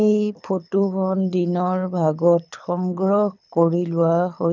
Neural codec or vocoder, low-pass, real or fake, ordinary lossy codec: codec, 24 kHz, 6 kbps, HILCodec; 7.2 kHz; fake; none